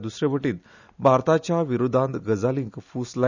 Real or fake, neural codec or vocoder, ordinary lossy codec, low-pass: real; none; none; 7.2 kHz